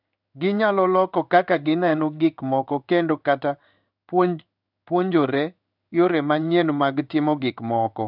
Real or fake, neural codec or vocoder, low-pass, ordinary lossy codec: fake; codec, 16 kHz in and 24 kHz out, 1 kbps, XY-Tokenizer; 5.4 kHz; none